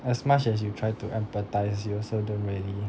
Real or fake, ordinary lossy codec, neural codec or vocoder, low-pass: real; none; none; none